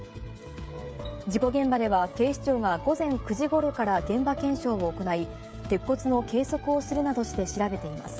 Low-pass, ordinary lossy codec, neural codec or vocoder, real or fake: none; none; codec, 16 kHz, 16 kbps, FreqCodec, smaller model; fake